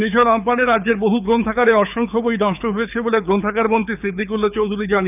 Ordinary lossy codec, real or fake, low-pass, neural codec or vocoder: none; fake; 3.6 kHz; codec, 24 kHz, 6 kbps, HILCodec